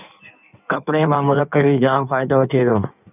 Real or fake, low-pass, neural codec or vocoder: fake; 3.6 kHz; codec, 16 kHz in and 24 kHz out, 1.1 kbps, FireRedTTS-2 codec